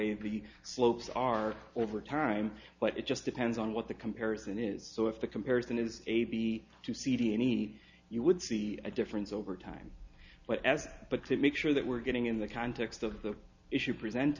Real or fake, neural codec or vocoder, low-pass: real; none; 7.2 kHz